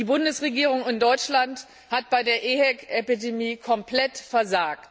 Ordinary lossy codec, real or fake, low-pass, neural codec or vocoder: none; real; none; none